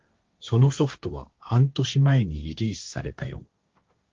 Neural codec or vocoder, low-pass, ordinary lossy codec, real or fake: codec, 16 kHz, 1.1 kbps, Voila-Tokenizer; 7.2 kHz; Opus, 32 kbps; fake